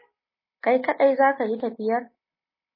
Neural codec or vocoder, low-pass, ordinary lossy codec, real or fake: none; 5.4 kHz; MP3, 24 kbps; real